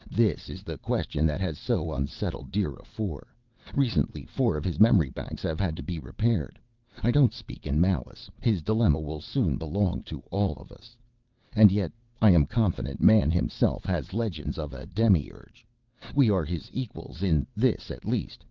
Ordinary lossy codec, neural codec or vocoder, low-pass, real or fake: Opus, 32 kbps; vocoder, 22.05 kHz, 80 mel bands, WaveNeXt; 7.2 kHz; fake